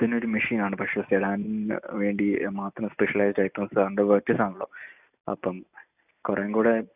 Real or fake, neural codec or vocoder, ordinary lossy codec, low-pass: real; none; none; 3.6 kHz